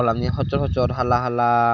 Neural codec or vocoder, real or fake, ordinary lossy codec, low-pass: none; real; none; 7.2 kHz